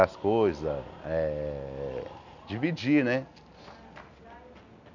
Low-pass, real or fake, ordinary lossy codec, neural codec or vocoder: 7.2 kHz; real; none; none